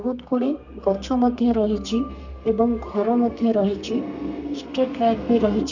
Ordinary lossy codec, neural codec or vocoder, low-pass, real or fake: none; codec, 32 kHz, 1.9 kbps, SNAC; 7.2 kHz; fake